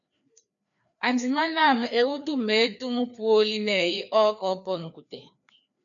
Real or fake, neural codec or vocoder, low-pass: fake; codec, 16 kHz, 2 kbps, FreqCodec, larger model; 7.2 kHz